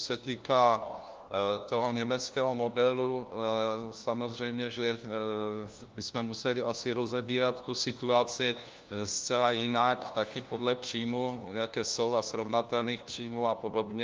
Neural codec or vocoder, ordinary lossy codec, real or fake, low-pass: codec, 16 kHz, 1 kbps, FunCodec, trained on LibriTTS, 50 frames a second; Opus, 24 kbps; fake; 7.2 kHz